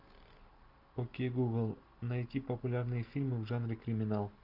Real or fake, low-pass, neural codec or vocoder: real; 5.4 kHz; none